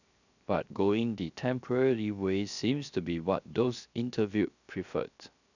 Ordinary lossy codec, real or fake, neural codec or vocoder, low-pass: none; fake; codec, 16 kHz, 0.7 kbps, FocalCodec; 7.2 kHz